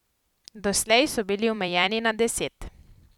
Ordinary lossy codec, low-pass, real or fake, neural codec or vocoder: none; 19.8 kHz; fake; vocoder, 44.1 kHz, 128 mel bands every 256 samples, BigVGAN v2